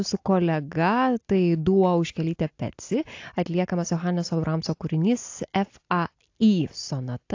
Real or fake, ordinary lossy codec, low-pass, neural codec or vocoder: real; AAC, 48 kbps; 7.2 kHz; none